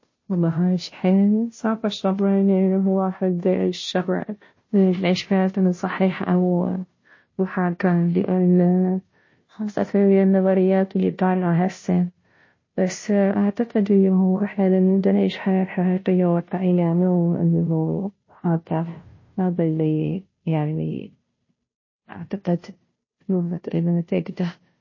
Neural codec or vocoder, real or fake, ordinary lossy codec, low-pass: codec, 16 kHz, 0.5 kbps, FunCodec, trained on Chinese and English, 25 frames a second; fake; MP3, 32 kbps; 7.2 kHz